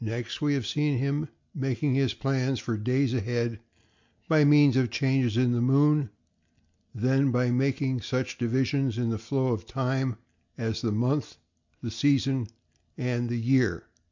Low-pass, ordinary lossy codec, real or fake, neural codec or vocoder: 7.2 kHz; MP3, 64 kbps; real; none